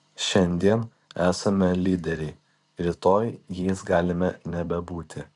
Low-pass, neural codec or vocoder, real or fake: 10.8 kHz; vocoder, 44.1 kHz, 128 mel bands every 512 samples, BigVGAN v2; fake